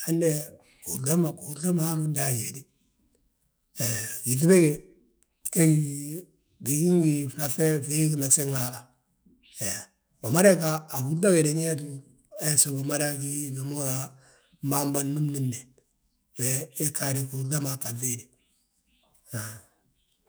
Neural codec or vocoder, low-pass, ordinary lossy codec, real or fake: autoencoder, 48 kHz, 128 numbers a frame, DAC-VAE, trained on Japanese speech; none; none; fake